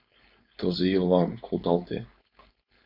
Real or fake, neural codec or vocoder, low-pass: fake; codec, 16 kHz, 4.8 kbps, FACodec; 5.4 kHz